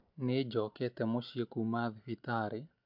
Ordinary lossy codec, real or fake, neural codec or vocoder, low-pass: MP3, 48 kbps; real; none; 5.4 kHz